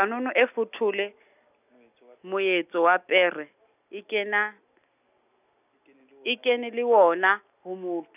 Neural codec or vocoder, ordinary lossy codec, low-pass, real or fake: none; none; 3.6 kHz; real